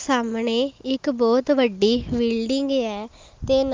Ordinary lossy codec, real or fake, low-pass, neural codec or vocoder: Opus, 32 kbps; real; 7.2 kHz; none